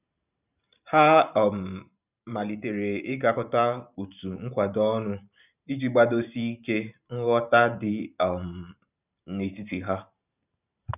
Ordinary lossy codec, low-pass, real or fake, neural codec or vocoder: none; 3.6 kHz; fake; vocoder, 44.1 kHz, 128 mel bands every 512 samples, BigVGAN v2